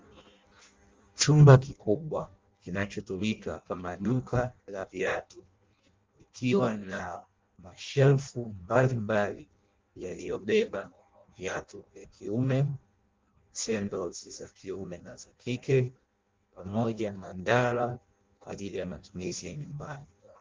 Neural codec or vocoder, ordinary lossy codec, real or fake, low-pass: codec, 16 kHz in and 24 kHz out, 0.6 kbps, FireRedTTS-2 codec; Opus, 32 kbps; fake; 7.2 kHz